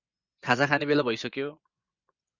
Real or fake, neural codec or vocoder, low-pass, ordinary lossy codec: fake; vocoder, 24 kHz, 100 mel bands, Vocos; 7.2 kHz; Opus, 64 kbps